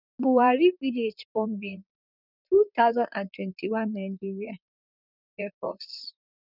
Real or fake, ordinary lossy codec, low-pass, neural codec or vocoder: fake; none; 5.4 kHz; vocoder, 44.1 kHz, 128 mel bands, Pupu-Vocoder